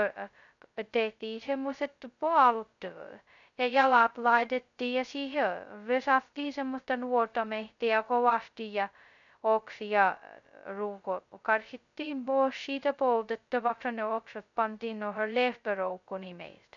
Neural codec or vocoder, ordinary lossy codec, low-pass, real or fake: codec, 16 kHz, 0.2 kbps, FocalCodec; none; 7.2 kHz; fake